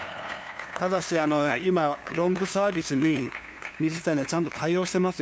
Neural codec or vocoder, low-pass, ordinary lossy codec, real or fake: codec, 16 kHz, 2 kbps, FunCodec, trained on LibriTTS, 25 frames a second; none; none; fake